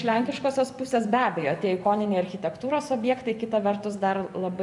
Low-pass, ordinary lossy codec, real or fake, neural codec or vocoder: 10.8 kHz; AAC, 64 kbps; fake; vocoder, 24 kHz, 100 mel bands, Vocos